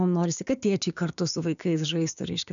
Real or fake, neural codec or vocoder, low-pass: fake; codec, 16 kHz, 6 kbps, DAC; 7.2 kHz